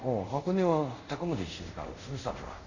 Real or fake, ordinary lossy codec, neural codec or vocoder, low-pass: fake; none; codec, 24 kHz, 0.5 kbps, DualCodec; 7.2 kHz